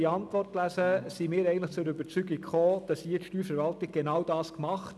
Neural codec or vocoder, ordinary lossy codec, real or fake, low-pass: none; none; real; none